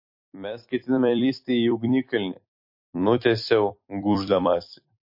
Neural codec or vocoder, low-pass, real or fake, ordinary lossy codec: vocoder, 44.1 kHz, 128 mel bands every 256 samples, BigVGAN v2; 5.4 kHz; fake; MP3, 32 kbps